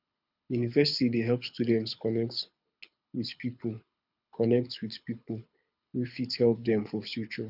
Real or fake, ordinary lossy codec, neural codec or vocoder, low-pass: fake; none; codec, 24 kHz, 6 kbps, HILCodec; 5.4 kHz